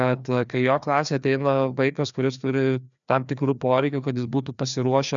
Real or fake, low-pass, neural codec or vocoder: fake; 7.2 kHz; codec, 16 kHz, 2 kbps, FreqCodec, larger model